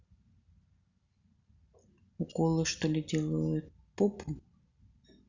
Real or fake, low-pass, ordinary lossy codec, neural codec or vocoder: real; 7.2 kHz; Opus, 64 kbps; none